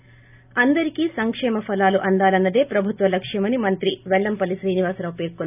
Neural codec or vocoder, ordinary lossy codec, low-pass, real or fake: none; none; 3.6 kHz; real